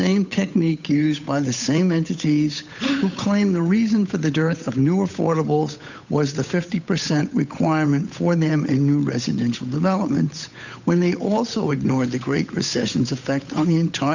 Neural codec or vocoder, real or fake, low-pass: codec, 16 kHz, 8 kbps, FunCodec, trained on Chinese and English, 25 frames a second; fake; 7.2 kHz